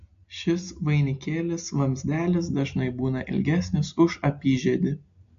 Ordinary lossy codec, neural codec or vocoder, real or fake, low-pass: AAC, 48 kbps; none; real; 7.2 kHz